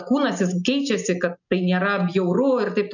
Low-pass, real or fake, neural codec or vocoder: 7.2 kHz; real; none